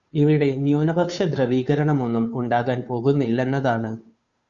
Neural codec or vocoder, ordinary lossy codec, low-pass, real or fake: codec, 16 kHz, 2 kbps, FunCodec, trained on Chinese and English, 25 frames a second; Opus, 64 kbps; 7.2 kHz; fake